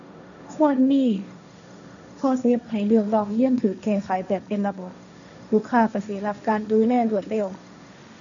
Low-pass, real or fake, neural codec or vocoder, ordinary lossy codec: 7.2 kHz; fake; codec, 16 kHz, 1.1 kbps, Voila-Tokenizer; none